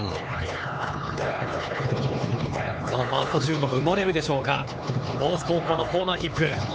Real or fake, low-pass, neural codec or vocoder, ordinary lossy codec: fake; none; codec, 16 kHz, 4 kbps, X-Codec, HuBERT features, trained on LibriSpeech; none